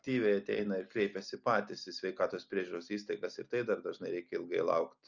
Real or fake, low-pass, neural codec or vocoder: real; 7.2 kHz; none